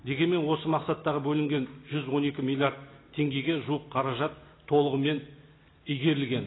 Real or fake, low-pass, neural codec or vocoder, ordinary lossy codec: real; 7.2 kHz; none; AAC, 16 kbps